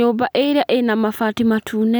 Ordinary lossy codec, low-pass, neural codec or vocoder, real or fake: none; none; none; real